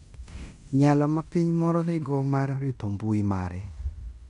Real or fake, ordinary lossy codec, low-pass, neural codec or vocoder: fake; none; 10.8 kHz; codec, 16 kHz in and 24 kHz out, 0.9 kbps, LongCat-Audio-Codec, fine tuned four codebook decoder